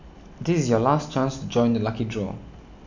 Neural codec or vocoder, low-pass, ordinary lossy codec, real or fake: none; 7.2 kHz; none; real